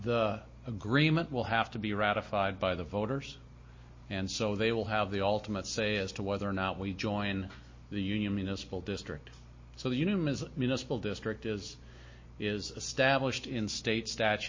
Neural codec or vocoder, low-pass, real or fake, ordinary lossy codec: none; 7.2 kHz; real; MP3, 32 kbps